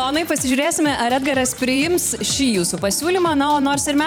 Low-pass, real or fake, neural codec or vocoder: 19.8 kHz; fake; vocoder, 44.1 kHz, 128 mel bands every 512 samples, BigVGAN v2